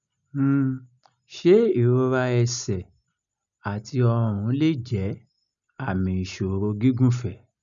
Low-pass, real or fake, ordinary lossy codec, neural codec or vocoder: 7.2 kHz; real; none; none